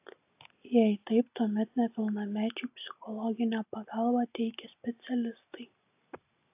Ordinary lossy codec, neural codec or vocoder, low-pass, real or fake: AAC, 32 kbps; none; 3.6 kHz; real